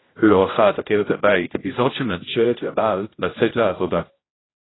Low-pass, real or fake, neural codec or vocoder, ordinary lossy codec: 7.2 kHz; fake; codec, 16 kHz, 0.5 kbps, X-Codec, HuBERT features, trained on general audio; AAC, 16 kbps